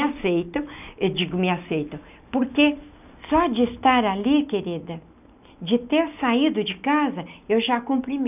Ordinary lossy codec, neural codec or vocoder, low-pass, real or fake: none; none; 3.6 kHz; real